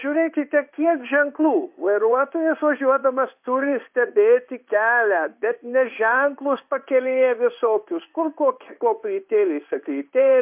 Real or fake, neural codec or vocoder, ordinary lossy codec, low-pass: fake; codec, 16 kHz in and 24 kHz out, 1 kbps, XY-Tokenizer; MP3, 32 kbps; 3.6 kHz